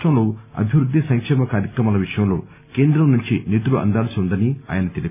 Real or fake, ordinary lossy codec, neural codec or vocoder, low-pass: real; none; none; 3.6 kHz